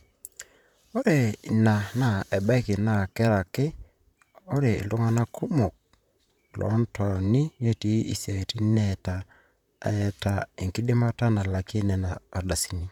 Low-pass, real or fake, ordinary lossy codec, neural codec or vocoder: 19.8 kHz; fake; none; vocoder, 44.1 kHz, 128 mel bands, Pupu-Vocoder